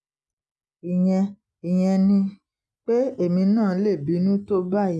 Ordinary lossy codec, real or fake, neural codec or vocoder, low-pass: none; real; none; none